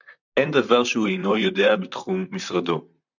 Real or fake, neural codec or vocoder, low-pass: fake; vocoder, 44.1 kHz, 128 mel bands, Pupu-Vocoder; 7.2 kHz